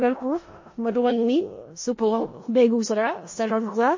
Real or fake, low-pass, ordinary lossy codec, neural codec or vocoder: fake; 7.2 kHz; MP3, 32 kbps; codec, 16 kHz in and 24 kHz out, 0.4 kbps, LongCat-Audio-Codec, four codebook decoder